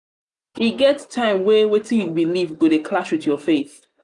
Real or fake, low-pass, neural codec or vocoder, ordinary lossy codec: real; 10.8 kHz; none; none